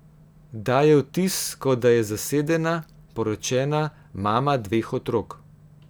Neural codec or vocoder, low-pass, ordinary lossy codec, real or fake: none; none; none; real